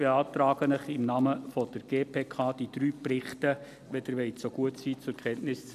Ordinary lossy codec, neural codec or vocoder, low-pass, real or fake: none; none; 14.4 kHz; real